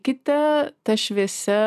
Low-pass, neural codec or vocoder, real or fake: 14.4 kHz; none; real